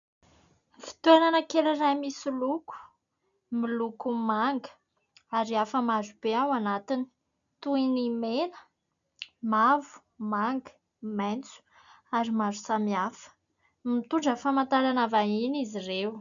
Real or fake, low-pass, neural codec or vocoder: real; 7.2 kHz; none